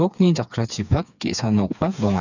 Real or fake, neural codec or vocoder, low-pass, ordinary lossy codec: fake; codec, 16 kHz, 4 kbps, FreqCodec, smaller model; 7.2 kHz; none